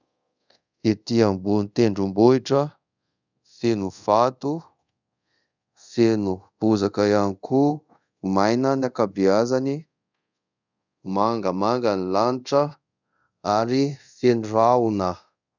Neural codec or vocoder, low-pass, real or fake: codec, 24 kHz, 0.5 kbps, DualCodec; 7.2 kHz; fake